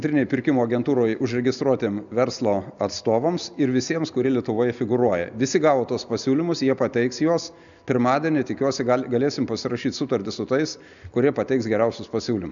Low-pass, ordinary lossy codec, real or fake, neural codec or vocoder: 7.2 kHz; MP3, 96 kbps; real; none